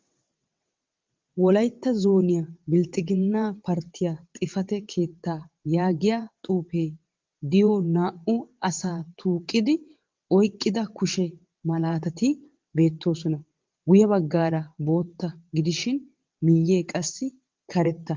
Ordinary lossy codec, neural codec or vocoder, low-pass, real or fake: Opus, 32 kbps; vocoder, 22.05 kHz, 80 mel bands, WaveNeXt; 7.2 kHz; fake